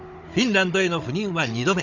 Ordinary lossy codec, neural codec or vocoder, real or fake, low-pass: none; codec, 16 kHz, 16 kbps, FunCodec, trained on Chinese and English, 50 frames a second; fake; 7.2 kHz